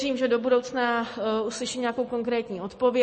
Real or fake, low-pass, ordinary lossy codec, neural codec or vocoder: real; 9.9 kHz; MP3, 32 kbps; none